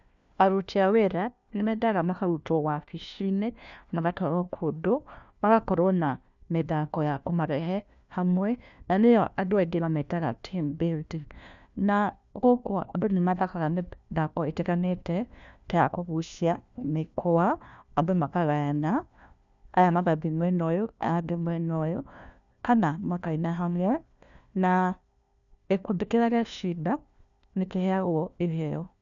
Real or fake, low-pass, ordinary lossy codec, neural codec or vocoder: fake; 7.2 kHz; none; codec, 16 kHz, 1 kbps, FunCodec, trained on LibriTTS, 50 frames a second